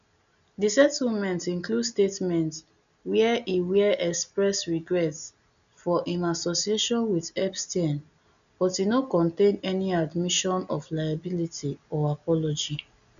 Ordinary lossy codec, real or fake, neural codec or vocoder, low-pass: none; real; none; 7.2 kHz